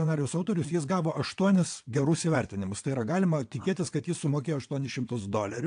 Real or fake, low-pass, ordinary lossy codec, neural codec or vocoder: fake; 9.9 kHz; AAC, 64 kbps; vocoder, 22.05 kHz, 80 mel bands, WaveNeXt